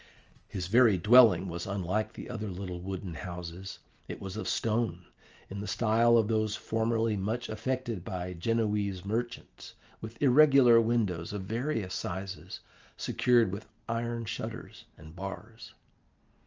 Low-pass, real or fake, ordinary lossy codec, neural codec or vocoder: 7.2 kHz; real; Opus, 24 kbps; none